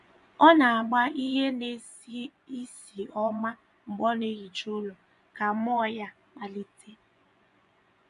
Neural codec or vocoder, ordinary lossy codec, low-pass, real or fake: vocoder, 24 kHz, 100 mel bands, Vocos; none; 10.8 kHz; fake